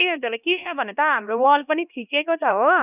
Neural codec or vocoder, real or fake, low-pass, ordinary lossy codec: codec, 16 kHz, 1 kbps, X-Codec, HuBERT features, trained on LibriSpeech; fake; 3.6 kHz; none